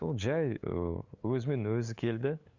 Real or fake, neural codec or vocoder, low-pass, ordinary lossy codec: fake; codec, 16 kHz, 8 kbps, FunCodec, trained on LibriTTS, 25 frames a second; 7.2 kHz; Opus, 64 kbps